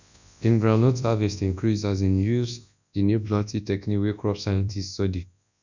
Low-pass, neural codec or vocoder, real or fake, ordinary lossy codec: 7.2 kHz; codec, 24 kHz, 0.9 kbps, WavTokenizer, large speech release; fake; none